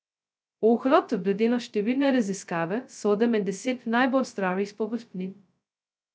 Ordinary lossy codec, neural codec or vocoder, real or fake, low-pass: none; codec, 16 kHz, 0.2 kbps, FocalCodec; fake; none